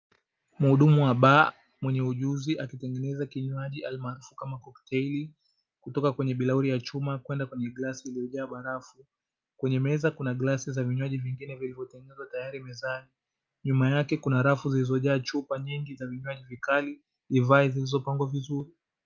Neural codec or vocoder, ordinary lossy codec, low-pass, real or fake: none; Opus, 24 kbps; 7.2 kHz; real